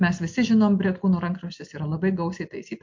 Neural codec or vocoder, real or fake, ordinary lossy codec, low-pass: none; real; MP3, 48 kbps; 7.2 kHz